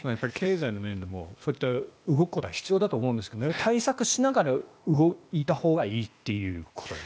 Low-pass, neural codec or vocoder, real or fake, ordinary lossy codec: none; codec, 16 kHz, 0.8 kbps, ZipCodec; fake; none